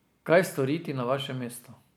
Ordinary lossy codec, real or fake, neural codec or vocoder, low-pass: none; real; none; none